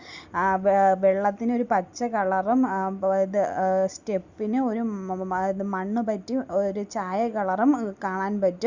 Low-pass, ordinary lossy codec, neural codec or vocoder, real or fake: 7.2 kHz; Opus, 64 kbps; none; real